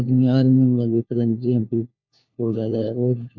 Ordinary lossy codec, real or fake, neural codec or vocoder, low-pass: MP3, 48 kbps; fake; codec, 16 kHz, 1 kbps, FunCodec, trained on LibriTTS, 50 frames a second; 7.2 kHz